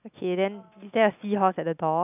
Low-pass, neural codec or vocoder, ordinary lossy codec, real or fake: 3.6 kHz; none; none; real